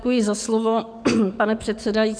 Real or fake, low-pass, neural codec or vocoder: fake; 9.9 kHz; codec, 44.1 kHz, 7.8 kbps, DAC